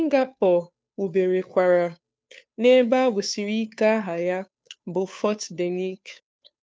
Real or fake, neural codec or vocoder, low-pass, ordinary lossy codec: fake; codec, 16 kHz, 2 kbps, FunCodec, trained on Chinese and English, 25 frames a second; none; none